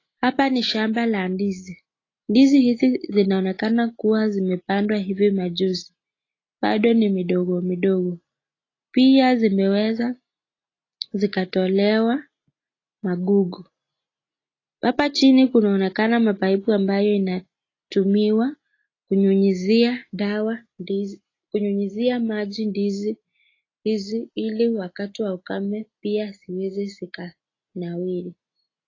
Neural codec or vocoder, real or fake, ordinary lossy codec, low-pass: none; real; AAC, 32 kbps; 7.2 kHz